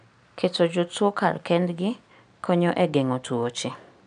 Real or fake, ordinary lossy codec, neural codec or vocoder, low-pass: real; AAC, 96 kbps; none; 9.9 kHz